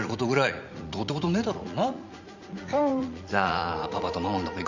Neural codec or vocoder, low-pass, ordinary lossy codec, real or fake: vocoder, 44.1 kHz, 80 mel bands, Vocos; 7.2 kHz; Opus, 64 kbps; fake